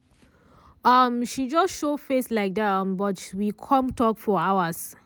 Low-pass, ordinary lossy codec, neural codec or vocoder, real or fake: none; none; none; real